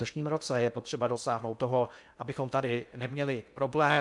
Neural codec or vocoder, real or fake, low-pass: codec, 16 kHz in and 24 kHz out, 0.6 kbps, FocalCodec, streaming, 2048 codes; fake; 10.8 kHz